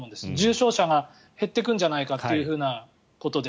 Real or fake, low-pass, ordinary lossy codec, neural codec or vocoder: real; none; none; none